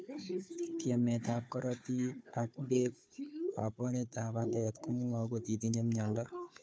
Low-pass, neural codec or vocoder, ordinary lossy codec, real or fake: none; codec, 16 kHz, 4 kbps, FunCodec, trained on Chinese and English, 50 frames a second; none; fake